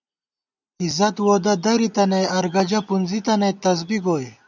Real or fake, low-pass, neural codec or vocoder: real; 7.2 kHz; none